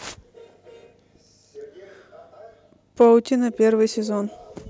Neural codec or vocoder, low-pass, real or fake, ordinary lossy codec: none; none; real; none